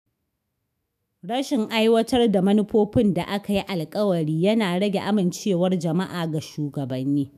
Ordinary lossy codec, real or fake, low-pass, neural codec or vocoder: none; fake; 14.4 kHz; autoencoder, 48 kHz, 128 numbers a frame, DAC-VAE, trained on Japanese speech